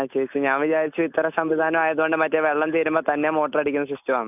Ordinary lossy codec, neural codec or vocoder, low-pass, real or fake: none; none; 3.6 kHz; real